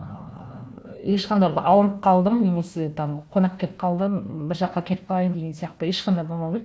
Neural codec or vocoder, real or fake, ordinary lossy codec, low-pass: codec, 16 kHz, 1 kbps, FunCodec, trained on Chinese and English, 50 frames a second; fake; none; none